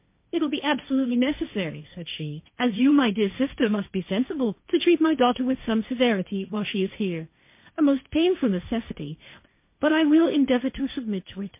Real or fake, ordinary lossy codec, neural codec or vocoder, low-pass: fake; MP3, 24 kbps; codec, 16 kHz, 1.1 kbps, Voila-Tokenizer; 3.6 kHz